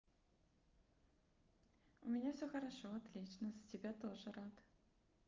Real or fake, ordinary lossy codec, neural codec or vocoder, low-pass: real; Opus, 24 kbps; none; 7.2 kHz